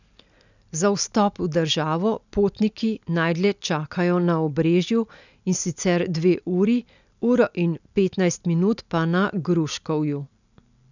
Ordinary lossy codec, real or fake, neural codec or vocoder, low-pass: none; real; none; 7.2 kHz